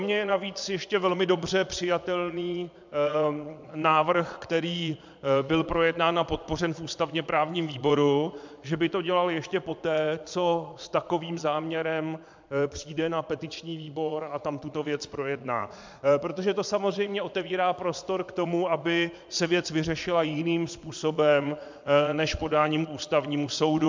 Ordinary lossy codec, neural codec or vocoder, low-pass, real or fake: MP3, 64 kbps; vocoder, 22.05 kHz, 80 mel bands, Vocos; 7.2 kHz; fake